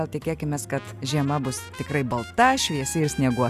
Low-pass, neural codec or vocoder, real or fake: 14.4 kHz; none; real